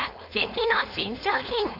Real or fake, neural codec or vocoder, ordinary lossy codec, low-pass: fake; codec, 16 kHz, 4.8 kbps, FACodec; AAC, 48 kbps; 5.4 kHz